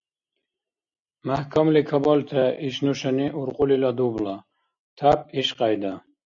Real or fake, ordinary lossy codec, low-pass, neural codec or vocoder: real; MP3, 48 kbps; 7.2 kHz; none